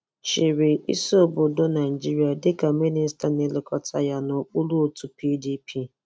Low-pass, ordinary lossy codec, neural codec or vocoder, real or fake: none; none; none; real